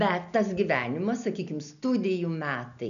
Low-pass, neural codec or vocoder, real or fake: 7.2 kHz; none; real